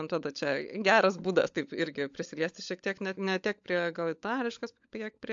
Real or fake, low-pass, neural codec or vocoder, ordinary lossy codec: fake; 7.2 kHz; codec, 16 kHz, 16 kbps, FunCodec, trained on LibriTTS, 50 frames a second; AAC, 64 kbps